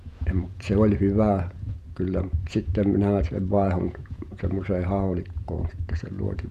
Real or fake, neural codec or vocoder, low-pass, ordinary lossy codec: fake; vocoder, 48 kHz, 128 mel bands, Vocos; 14.4 kHz; none